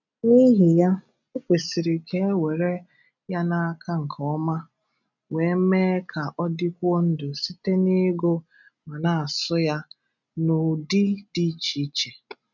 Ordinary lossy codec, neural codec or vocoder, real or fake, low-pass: none; none; real; 7.2 kHz